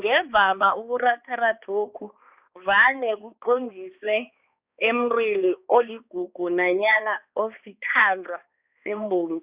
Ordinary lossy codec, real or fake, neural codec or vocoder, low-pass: Opus, 64 kbps; fake; codec, 16 kHz, 2 kbps, X-Codec, HuBERT features, trained on balanced general audio; 3.6 kHz